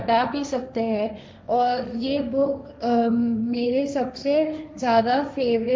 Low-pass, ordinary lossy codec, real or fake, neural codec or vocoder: none; none; fake; codec, 16 kHz, 1.1 kbps, Voila-Tokenizer